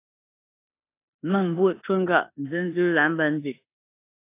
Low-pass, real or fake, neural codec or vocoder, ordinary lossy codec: 3.6 kHz; fake; codec, 16 kHz in and 24 kHz out, 0.9 kbps, LongCat-Audio-Codec, four codebook decoder; AAC, 24 kbps